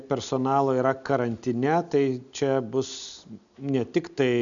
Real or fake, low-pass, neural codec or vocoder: real; 7.2 kHz; none